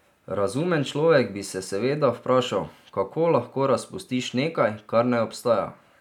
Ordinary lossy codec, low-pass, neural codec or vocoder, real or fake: none; 19.8 kHz; none; real